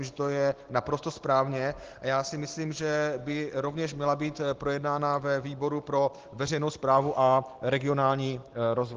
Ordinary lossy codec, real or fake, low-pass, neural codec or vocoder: Opus, 16 kbps; real; 7.2 kHz; none